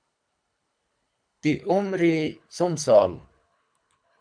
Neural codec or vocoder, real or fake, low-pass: codec, 24 kHz, 3 kbps, HILCodec; fake; 9.9 kHz